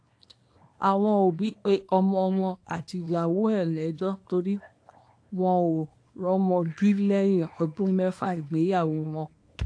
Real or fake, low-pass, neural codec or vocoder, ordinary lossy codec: fake; 9.9 kHz; codec, 24 kHz, 0.9 kbps, WavTokenizer, small release; AAC, 48 kbps